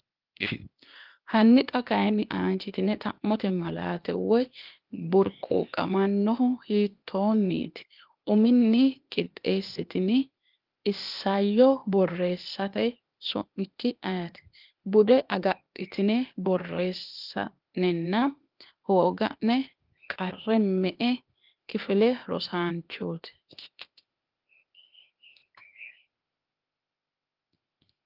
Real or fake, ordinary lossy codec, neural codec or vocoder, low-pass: fake; Opus, 32 kbps; codec, 16 kHz, 0.8 kbps, ZipCodec; 5.4 kHz